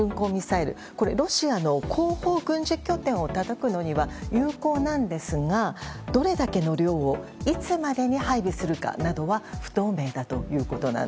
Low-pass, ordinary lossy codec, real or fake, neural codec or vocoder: none; none; real; none